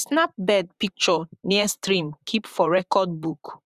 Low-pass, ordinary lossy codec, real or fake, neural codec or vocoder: 14.4 kHz; Opus, 64 kbps; fake; vocoder, 44.1 kHz, 128 mel bands, Pupu-Vocoder